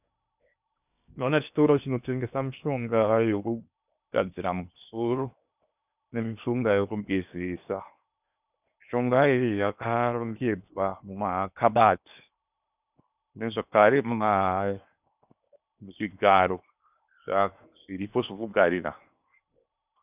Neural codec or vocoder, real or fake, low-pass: codec, 16 kHz in and 24 kHz out, 0.8 kbps, FocalCodec, streaming, 65536 codes; fake; 3.6 kHz